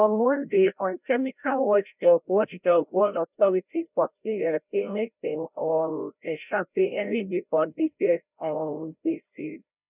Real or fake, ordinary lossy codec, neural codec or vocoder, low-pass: fake; none; codec, 16 kHz, 0.5 kbps, FreqCodec, larger model; 3.6 kHz